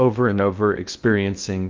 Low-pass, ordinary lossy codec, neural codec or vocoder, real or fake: 7.2 kHz; Opus, 24 kbps; codec, 16 kHz in and 24 kHz out, 0.6 kbps, FocalCodec, streaming, 2048 codes; fake